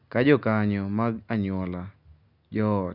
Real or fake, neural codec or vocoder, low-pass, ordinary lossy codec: real; none; 5.4 kHz; none